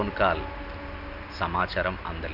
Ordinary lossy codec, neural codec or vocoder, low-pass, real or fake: none; none; 5.4 kHz; real